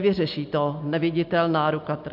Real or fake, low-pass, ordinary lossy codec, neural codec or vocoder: real; 5.4 kHz; MP3, 48 kbps; none